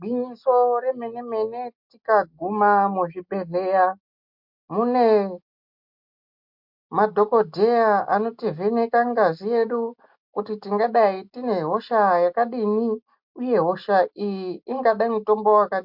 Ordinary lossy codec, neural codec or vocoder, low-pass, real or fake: MP3, 48 kbps; none; 5.4 kHz; real